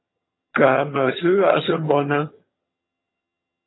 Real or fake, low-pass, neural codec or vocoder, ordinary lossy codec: fake; 7.2 kHz; vocoder, 22.05 kHz, 80 mel bands, HiFi-GAN; AAC, 16 kbps